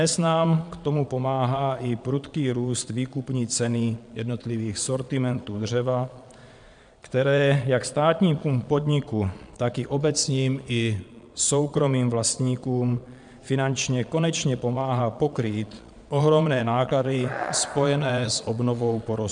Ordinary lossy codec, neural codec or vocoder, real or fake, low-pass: MP3, 96 kbps; vocoder, 22.05 kHz, 80 mel bands, Vocos; fake; 9.9 kHz